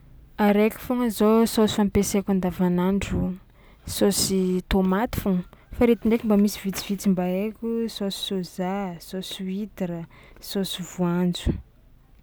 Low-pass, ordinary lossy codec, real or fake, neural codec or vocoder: none; none; real; none